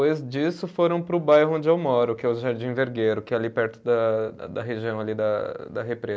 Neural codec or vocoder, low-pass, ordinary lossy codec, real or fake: none; none; none; real